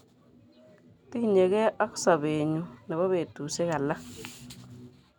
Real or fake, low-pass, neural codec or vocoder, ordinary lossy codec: real; none; none; none